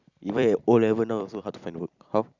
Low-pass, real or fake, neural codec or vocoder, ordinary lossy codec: 7.2 kHz; fake; vocoder, 44.1 kHz, 128 mel bands every 256 samples, BigVGAN v2; Opus, 64 kbps